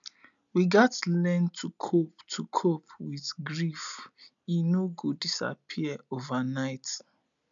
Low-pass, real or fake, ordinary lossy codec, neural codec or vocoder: 7.2 kHz; real; none; none